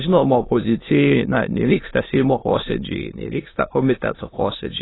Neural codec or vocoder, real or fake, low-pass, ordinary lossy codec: autoencoder, 22.05 kHz, a latent of 192 numbers a frame, VITS, trained on many speakers; fake; 7.2 kHz; AAC, 16 kbps